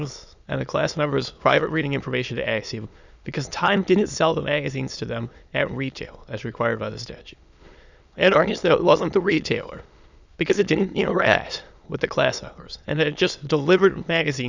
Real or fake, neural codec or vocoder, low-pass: fake; autoencoder, 22.05 kHz, a latent of 192 numbers a frame, VITS, trained on many speakers; 7.2 kHz